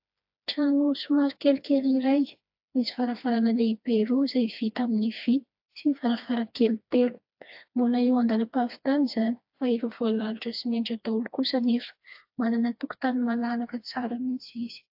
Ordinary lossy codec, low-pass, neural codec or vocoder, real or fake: AAC, 48 kbps; 5.4 kHz; codec, 16 kHz, 2 kbps, FreqCodec, smaller model; fake